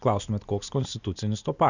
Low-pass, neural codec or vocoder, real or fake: 7.2 kHz; none; real